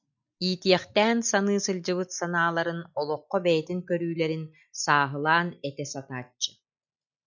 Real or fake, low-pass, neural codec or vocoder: real; 7.2 kHz; none